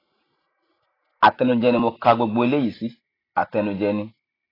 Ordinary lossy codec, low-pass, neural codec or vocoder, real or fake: AAC, 24 kbps; 5.4 kHz; none; real